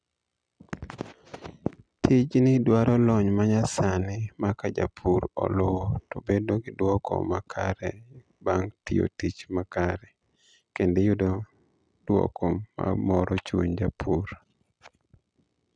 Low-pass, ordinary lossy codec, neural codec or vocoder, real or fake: 9.9 kHz; none; none; real